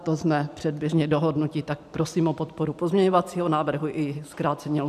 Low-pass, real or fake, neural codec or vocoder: 14.4 kHz; fake; vocoder, 44.1 kHz, 128 mel bands every 512 samples, BigVGAN v2